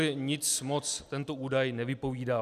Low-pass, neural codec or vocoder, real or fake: 14.4 kHz; none; real